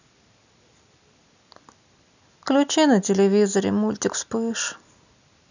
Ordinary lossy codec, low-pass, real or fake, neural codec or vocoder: none; 7.2 kHz; real; none